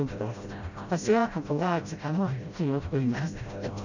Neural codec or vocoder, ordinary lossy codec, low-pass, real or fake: codec, 16 kHz, 0.5 kbps, FreqCodec, smaller model; none; 7.2 kHz; fake